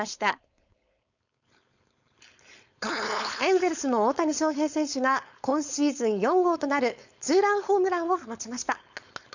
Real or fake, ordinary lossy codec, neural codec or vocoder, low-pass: fake; none; codec, 16 kHz, 4.8 kbps, FACodec; 7.2 kHz